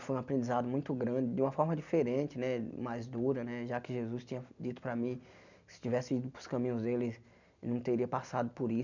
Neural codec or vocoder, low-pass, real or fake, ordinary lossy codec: none; 7.2 kHz; real; none